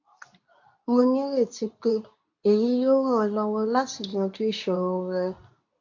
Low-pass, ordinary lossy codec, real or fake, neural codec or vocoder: 7.2 kHz; AAC, 48 kbps; fake; codec, 24 kHz, 0.9 kbps, WavTokenizer, medium speech release version 2